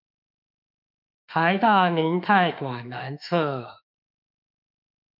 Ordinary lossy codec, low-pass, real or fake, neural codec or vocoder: MP3, 48 kbps; 5.4 kHz; fake; autoencoder, 48 kHz, 32 numbers a frame, DAC-VAE, trained on Japanese speech